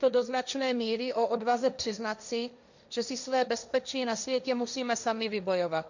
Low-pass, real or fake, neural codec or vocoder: 7.2 kHz; fake; codec, 16 kHz, 1.1 kbps, Voila-Tokenizer